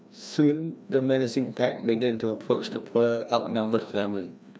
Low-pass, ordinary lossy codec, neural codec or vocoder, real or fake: none; none; codec, 16 kHz, 1 kbps, FreqCodec, larger model; fake